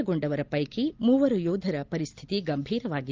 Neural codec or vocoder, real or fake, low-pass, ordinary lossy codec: none; real; 7.2 kHz; Opus, 32 kbps